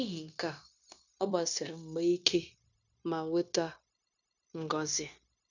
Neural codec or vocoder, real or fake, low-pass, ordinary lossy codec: codec, 16 kHz, 0.9 kbps, LongCat-Audio-Codec; fake; 7.2 kHz; none